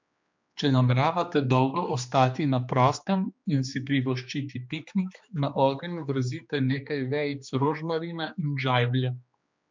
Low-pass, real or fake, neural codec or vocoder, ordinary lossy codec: 7.2 kHz; fake; codec, 16 kHz, 2 kbps, X-Codec, HuBERT features, trained on general audio; MP3, 64 kbps